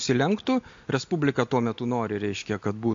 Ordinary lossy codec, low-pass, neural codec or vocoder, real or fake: MP3, 48 kbps; 7.2 kHz; none; real